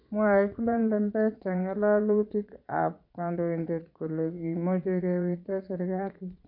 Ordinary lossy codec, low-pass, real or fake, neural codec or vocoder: none; 5.4 kHz; fake; vocoder, 22.05 kHz, 80 mel bands, Vocos